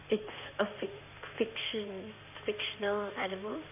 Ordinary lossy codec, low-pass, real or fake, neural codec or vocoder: none; 3.6 kHz; fake; codec, 16 kHz in and 24 kHz out, 2.2 kbps, FireRedTTS-2 codec